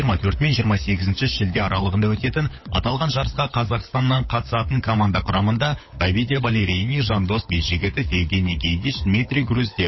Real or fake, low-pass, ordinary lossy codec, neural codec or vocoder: fake; 7.2 kHz; MP3, 24 kbps; codec, 16 kHz, 16 kbps, FunCodec, trained on LibriTTS, 50 frames a second